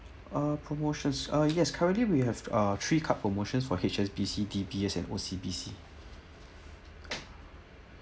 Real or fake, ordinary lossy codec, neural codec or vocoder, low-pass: real; none; none; none